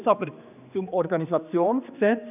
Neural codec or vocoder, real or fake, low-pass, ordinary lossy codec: codec, 16 kHz, 2 kbps, X-Codec, HuBERT features, trained on general audio; fake; 3.6 kHz; none